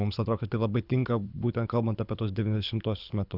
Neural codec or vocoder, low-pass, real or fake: codec, 24 kHz, 6 kbps, HILCodec; 5.4 kHz; fake